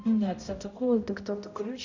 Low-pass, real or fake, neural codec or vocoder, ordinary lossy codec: 7.2 kHz; fake; codec, 16 kHz, 0.5 kbps, X-Codec, HuBERT features, trained on balanced general audio; Opus, 64 kbps